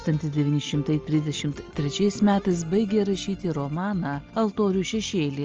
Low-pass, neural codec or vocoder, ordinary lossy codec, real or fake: 7.2 kHz; none; Opus, 24 kbps; real